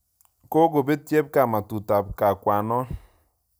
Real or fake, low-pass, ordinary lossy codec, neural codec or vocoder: real; none; none; none